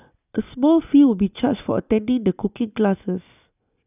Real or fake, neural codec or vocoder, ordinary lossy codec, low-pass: real; none; none; 3.6 kHz